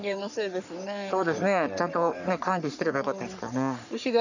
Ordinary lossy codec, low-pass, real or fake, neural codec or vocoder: none; 7.2 kHz; fake; codec, 44.1 kHz, 3.4 kbps, Pupu-Codec